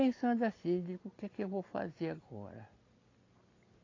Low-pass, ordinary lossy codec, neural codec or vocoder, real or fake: 7.2 kHz; AAC, 32 kbps; none; real